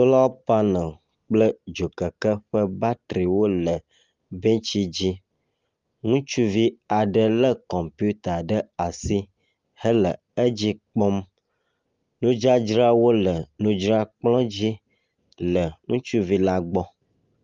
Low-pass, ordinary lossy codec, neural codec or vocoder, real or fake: 7.2 kHz; Opus, 32 kbps; none; real